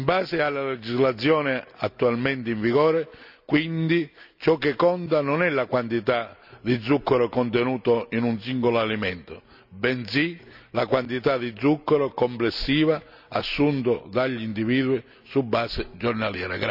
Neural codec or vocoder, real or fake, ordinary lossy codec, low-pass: none; real; none; 5.4 kHz